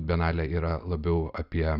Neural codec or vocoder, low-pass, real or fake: none; 5.4 kHz; real